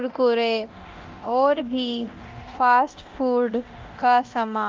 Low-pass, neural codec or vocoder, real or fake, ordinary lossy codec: 7.2 kHz; codec, 24 kHz, 0.9 kbps, DualCodec; fake; Opus, 24 kbps